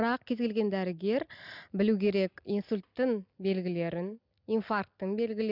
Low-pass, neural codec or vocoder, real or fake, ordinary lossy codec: 5.4 kHz; none; real; none